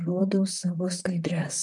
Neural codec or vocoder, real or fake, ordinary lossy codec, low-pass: vocoder, 44.1 kHz, 128 mel bands, Pupu-Vocoder; fake; MP3, 96 kbps; 10.8 kHz